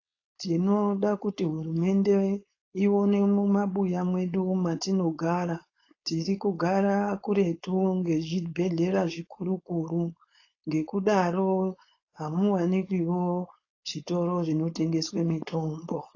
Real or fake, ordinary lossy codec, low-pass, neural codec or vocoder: fake; AAC, 32 kbps; 7.2 kHz; codec, 16 kHz, 4.8 kbps, FACodec